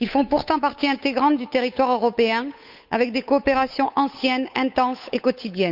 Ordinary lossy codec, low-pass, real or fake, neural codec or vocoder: none; 5.4 kHz; fake; codec, 16 kHz, 8 kbps, FunCodec, trained on Chinese and English, 25 frames a second